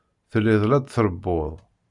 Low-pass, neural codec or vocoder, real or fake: 10.8 kHz; none; real